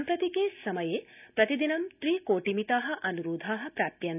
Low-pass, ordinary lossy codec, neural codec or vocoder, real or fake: 3.6 kHz; none; none; real